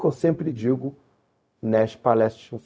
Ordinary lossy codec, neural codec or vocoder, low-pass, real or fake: none; codec, 16 kHz, 0.4 kbps, LongCat-Audio-Codec; none; fake